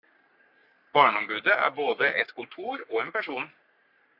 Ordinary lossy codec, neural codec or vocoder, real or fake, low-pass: AAC, 48 kbps; codec, 44.1 kHz, 3.4 kbps, Pupu-Codec; fake; 5.4 kHz